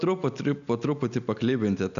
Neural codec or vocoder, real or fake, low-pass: none; real; 7.2 kHz